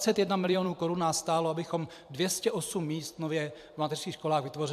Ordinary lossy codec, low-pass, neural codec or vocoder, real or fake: AAC, 96 kbps; 14.4 kHz; vocoder, 44.1 kHz, 128 mel bands every 256 samples, BigVGAN v2; fake